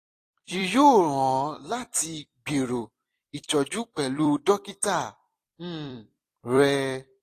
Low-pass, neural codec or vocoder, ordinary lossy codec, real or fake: 14.4 kHz; vocoder, 44.1 kHz, 128 mel bands every 256 samples, BigVGAN v2; AAC, 48 kbps; fake